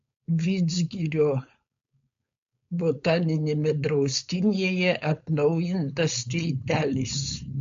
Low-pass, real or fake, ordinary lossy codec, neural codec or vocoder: 7.2 kHz; fake; MP3, 48 kbps; codec, 16 kHz, 4.8 kbps, FACodec